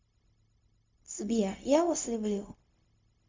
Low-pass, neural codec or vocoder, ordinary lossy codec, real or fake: 7.2 kHz; codec, 16 kHz, 0.4 kbps, LongCat-Audio-Codec; MP3, 64 kbps; fake